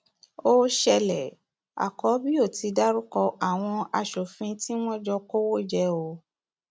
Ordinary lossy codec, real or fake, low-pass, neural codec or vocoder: none; real; none; none